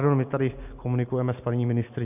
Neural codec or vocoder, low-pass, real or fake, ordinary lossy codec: none; 3.6 kHz; real; AAC, 32 kbps